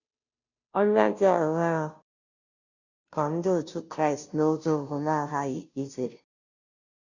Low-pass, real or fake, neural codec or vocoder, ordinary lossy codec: 7.2 kHz; fake; codec, 16 kHz, 0.5 kbps, FunCodec, trained on Chinese and English, 25 frames a second; none